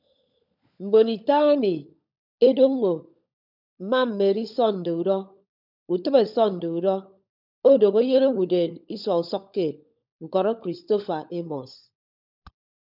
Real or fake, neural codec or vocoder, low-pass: fake; codec, 16 kHz, 16 kbps, FunCodec, trained on LibriTTS, 50 frames a second; 5.4 kHz